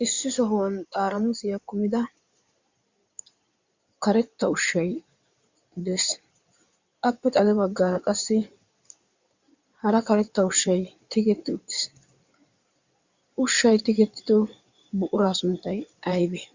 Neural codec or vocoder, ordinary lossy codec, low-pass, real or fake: codec, 16 kHz in and 24 kHz out, 2.2 kbps, FireRedTTS-2 codec; Opus, 64 kbps; 7.2 kHz; fake